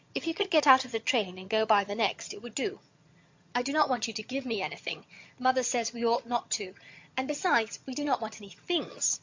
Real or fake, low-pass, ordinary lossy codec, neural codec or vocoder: fake; 7.2 kHz; MP3, 48 kbps; vocoder, 22.05 kHz, 80 mel bands, HiFi-GAN